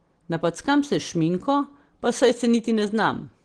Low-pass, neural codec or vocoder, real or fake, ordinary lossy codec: 9.9 kHz; none; real; Opus, 16 kbps